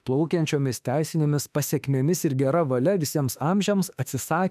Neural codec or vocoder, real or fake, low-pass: autoencoder, 48 kHz, 32 numbers a frame, DAC-VAE, trained on Japanese speech; fake; 14.4 kHz